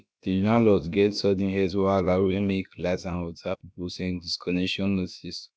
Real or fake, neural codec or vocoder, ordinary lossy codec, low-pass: fake; codec, 16 kHz, about 1 kbps, DyCAST, with the encoder's durations; none; none